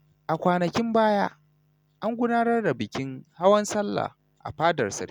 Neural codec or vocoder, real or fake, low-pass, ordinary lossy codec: none; real; 19.8 kHz; none